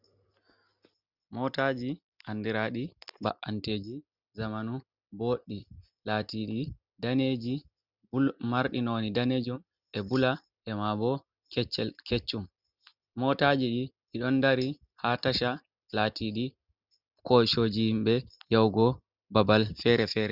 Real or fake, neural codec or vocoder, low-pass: real; none; 5.4 kHz